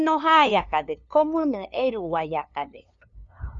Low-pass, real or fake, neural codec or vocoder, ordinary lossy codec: 7.2 kHz; fake; codec, 16 kHz, 2 kbps, FunCodec, trained on LibriTTS, 25 frames a second; Opus, 64 kbps